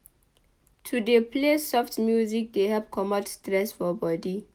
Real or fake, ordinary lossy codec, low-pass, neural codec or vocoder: real; none; none; none